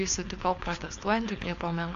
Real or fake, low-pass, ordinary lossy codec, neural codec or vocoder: fake; 7.2 kHz; AAC, 64 kbps; codec, 16 kHz, 4.8 kbps, FACodec